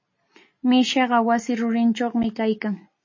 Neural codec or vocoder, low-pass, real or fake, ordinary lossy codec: none; 7.2 kHz; real; MP3, 32 kbps